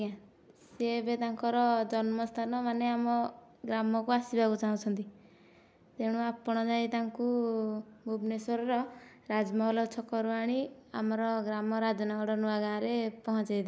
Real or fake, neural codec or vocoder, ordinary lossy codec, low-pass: real; none; none; none